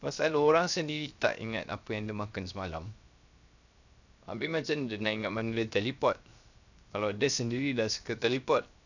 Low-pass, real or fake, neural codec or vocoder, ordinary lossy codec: 7.2 kHz; fake; codec, 16 kHz, 0.7 kbps, FocalCodec; none